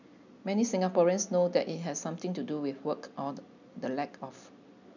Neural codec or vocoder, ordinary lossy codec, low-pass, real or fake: none; none; 7.2 kHz; real